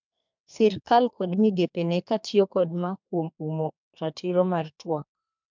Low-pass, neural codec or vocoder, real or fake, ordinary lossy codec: 7.2 kHz; codec, 32 kHz, 1.9 kbps, SNAC; fake; MP3, 64 kbps